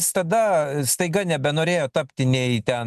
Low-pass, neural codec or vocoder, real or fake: 14.4 kHz; none; real